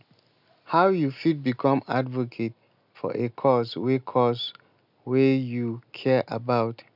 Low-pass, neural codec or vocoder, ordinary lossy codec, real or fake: 5.4 kHz; none; none; real